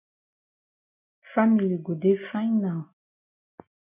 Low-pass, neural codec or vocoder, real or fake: 3.6 kHz; none; real